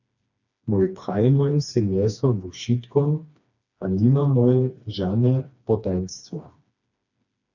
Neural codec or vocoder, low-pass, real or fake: codec, 16 kHz, 2 kbps, FreqCodec, smaller model; 7.2 kHz; fake